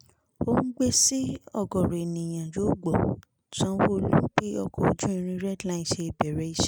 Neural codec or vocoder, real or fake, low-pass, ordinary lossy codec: none; real; none; none